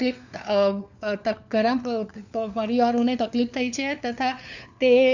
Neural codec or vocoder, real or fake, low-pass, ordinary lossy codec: codec, 16 kHz, 4 kbps, FunCodec, trained on LibriTTS, 50 frames a second; fake; 7.2 kHz; none